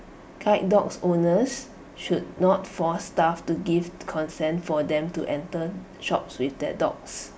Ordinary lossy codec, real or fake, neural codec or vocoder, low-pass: none; real; none; none